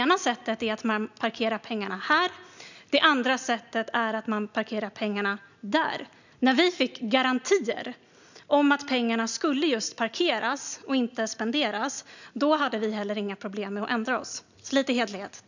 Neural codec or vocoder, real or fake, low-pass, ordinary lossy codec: none; real; 7.2 kHz; none